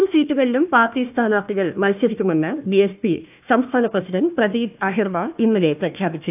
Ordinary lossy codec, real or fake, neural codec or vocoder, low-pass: AAC, 32 kbps; fake; codec, 16 kHz, 1 kbps, FunCodec, trained on Chinese and English, 50 frames a second; 3.6 kHz